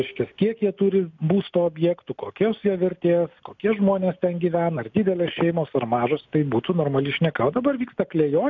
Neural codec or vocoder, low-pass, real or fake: none; 7.2 kHz; real